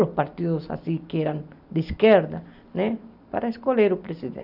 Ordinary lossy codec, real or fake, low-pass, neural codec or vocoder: none; real; 5.4 kHz; none